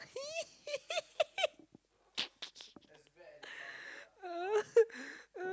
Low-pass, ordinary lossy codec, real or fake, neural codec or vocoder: none; none; real; none